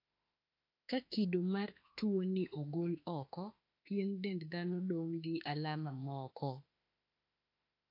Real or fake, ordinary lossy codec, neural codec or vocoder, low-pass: fake; AAC, 32 kbps; autoencoder, 48 kHz, 32 numbers a frame, DAC-VAE, trained on Japanese speech; 5.4 kHz